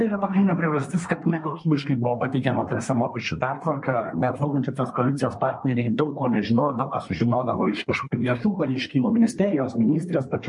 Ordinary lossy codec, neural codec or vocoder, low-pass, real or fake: MP3, 48 kbps; codec, 24 kHz, 1 kbps, SNAC; 10.8 kHz; fake